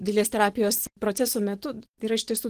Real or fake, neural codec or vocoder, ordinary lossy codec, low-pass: fake; vocoder, 44.1 kHz, 128 mel bands every 512 samples, BigVGAN v2; Opus, 16 kbps; 14.4 kHz